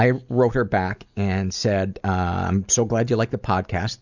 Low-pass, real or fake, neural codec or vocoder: 7.2 kHz; real; none